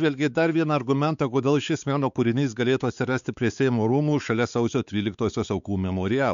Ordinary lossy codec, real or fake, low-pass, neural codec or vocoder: MP3, 96 kbps; fake; 7.2 kHz; codec, 16 kHz, 4 kbps, X-Codec, WavLM features, trained on Multilingual LibriSpeech